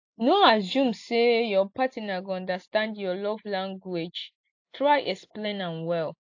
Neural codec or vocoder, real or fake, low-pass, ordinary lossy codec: none; real; 7.2 kHz; AAC, 48 kbps